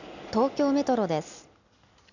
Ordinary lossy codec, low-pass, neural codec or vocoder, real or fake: none; 7.2 kHz; none; real